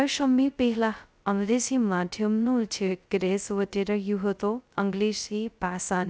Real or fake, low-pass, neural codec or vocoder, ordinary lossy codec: fake; none; codec, 16 kHz, 0.2 kbps, FocalCodec; none